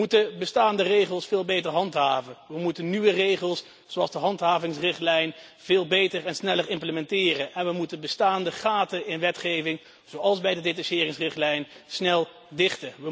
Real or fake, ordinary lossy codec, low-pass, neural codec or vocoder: real; none; none; none